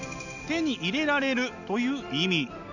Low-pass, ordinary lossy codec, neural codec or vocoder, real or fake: 7.2 kHz; none; none; real